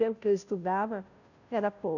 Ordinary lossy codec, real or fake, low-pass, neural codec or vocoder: none; fake; 7.2 kHz; codec, 16 kHz, 0.5 kbps, FunCodec, trained on Chinese and English, 25 frames a second